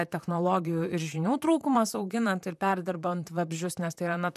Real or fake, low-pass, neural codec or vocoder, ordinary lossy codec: fake; 14.4 kHz; vocoder, 44.1 kHz, 128 mel bands, Pupu-Vocoder; MP3, 96 kbps